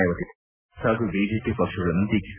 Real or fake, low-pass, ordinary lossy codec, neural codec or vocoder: real; 3.6 kHz; none; none